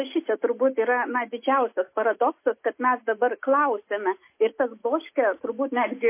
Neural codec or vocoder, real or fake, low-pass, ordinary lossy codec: none; real; 3.6 kHz; MP3, 24 kbps